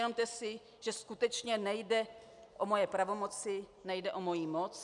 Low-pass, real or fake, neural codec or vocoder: 10.8 kHz; real; none